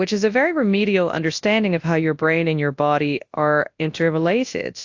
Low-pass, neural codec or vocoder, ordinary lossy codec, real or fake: 7.2 kHz; codec, 24 kHz, 0.9 kbps, WavTokenizer, large speech release; AAC, 48 kbps; fake